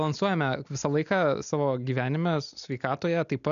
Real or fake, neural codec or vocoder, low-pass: real; none; 7.2 kHz